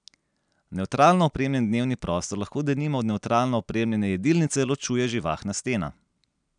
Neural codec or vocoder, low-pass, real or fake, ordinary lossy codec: none; 9.9 kHz; real; none